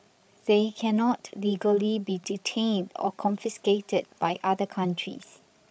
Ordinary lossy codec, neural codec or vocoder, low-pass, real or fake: none; codec, 16 kHz, 16 kbps, FreqCodec, larger model; none; fake